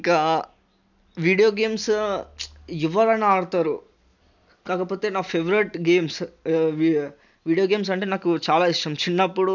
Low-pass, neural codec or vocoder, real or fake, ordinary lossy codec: 7.2 kHz; none; real; none